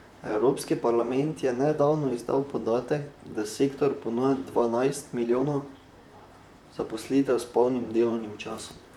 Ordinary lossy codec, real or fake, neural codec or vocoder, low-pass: none; fake; vocoder, 44.1 kHz, 128 mel bands, Pupu-Vocoder; 19.8 kHz